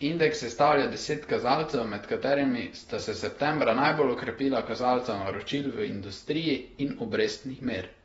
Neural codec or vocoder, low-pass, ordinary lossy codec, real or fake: vocoder, 48 kHz, 128 mel bands, Vocos; 19.8 kHz; AAC, 24 kbps; fake